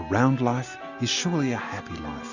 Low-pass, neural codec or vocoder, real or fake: 7.2 kHz; none; real